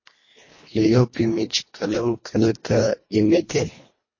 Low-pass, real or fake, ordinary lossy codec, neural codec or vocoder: 7.2 kHz; fake; MP3, 32 kbps; codec, 24 kHz, 1.5 kbps, HILCodec